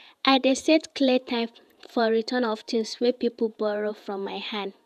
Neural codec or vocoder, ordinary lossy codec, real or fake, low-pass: vocoder, 48 kHz, 128 mel bands, Vocos; none; fake; 14.4 kHz